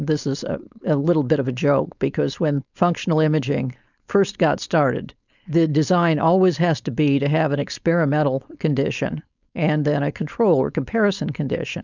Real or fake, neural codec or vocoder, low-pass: fake; codec, 16 kHz, 4.8 kbps, FACodec; 7.2 kHz